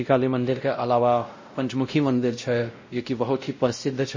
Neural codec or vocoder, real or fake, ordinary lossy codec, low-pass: codec, 16 kHz, 0.5 kbps, X-Codec, WavLM features, trained on Multilingual LibriSpeech; fake; MP3, 32 kbps; 7.2 kHz